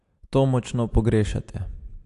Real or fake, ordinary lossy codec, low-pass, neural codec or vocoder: real; AAC, 64 kbps; 10.8 kHz; none